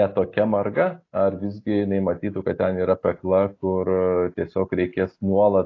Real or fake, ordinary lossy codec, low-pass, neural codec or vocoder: real; AAC, 32 kbps; 7.2 kHz; none